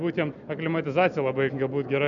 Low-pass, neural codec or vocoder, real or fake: 7.2 kHz; none; real